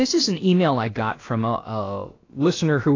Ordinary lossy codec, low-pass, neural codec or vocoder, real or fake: AAC, 32 kbps; 7.2 kHz; codec, 16 kHz, about 1 kbps, DyCAST, with the encoder's durations; fake